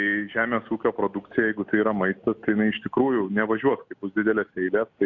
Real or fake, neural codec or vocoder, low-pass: real; none; 7.2 kHz